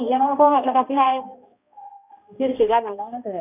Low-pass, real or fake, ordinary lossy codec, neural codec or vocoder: 3.6 kHz; fake; none; codec, 16 kHz, 1 kbps, X-Codec, HuBERT features, trained on balanced general audio